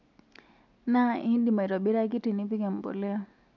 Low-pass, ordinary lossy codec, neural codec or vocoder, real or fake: 7.2 kHz; MP3, 64 kbps; none; real